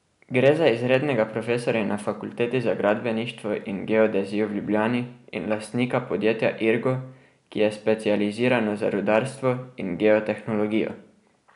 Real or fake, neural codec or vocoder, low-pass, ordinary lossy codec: real; none; 10.8 kHz; none